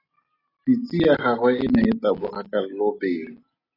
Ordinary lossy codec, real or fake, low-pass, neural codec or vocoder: AAC, 24 kbps; real; 5.4 kHz; none